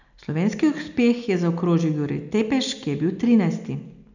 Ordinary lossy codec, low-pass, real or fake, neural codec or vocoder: none; 7.2 kHz; real; none